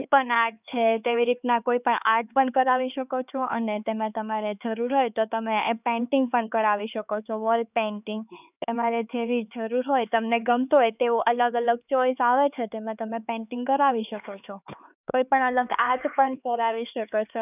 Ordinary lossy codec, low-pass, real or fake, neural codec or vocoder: none; 3.6 kHz; fake; codec, 16 kHz, 4 kbps, X-Codec, HuBERT features, trained on LibriSpeech